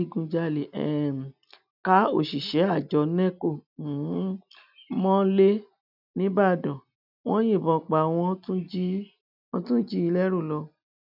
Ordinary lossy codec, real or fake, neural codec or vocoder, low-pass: none; real; none; 5.4 kHz